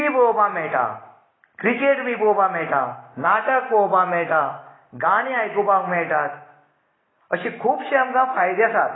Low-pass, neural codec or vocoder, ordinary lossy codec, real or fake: 7.2 kHz; none; AAC, 16 kbps; real